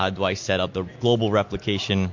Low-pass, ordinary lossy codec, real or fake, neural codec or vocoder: 7.2 kHz; MP3, 48 kbps; real; none